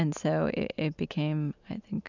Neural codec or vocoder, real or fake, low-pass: none; real; 7.2 kHz